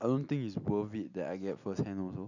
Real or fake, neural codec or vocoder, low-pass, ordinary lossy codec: real; none; 7.2 kHz; none